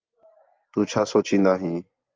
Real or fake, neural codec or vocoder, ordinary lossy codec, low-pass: fake; vocoder, 24 kHz, 100 mel bands, Vocos; Opus, 32 kbps; 7.2 kHz